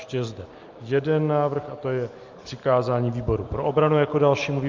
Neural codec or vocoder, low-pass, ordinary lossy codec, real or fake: none; 7.2 kHz; Opus, 24 kbps; real